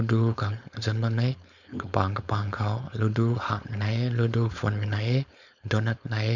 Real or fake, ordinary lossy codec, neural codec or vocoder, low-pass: fake; none; codec, 16 kHz, 4.8 kbps, FACodec; 7.2 kHz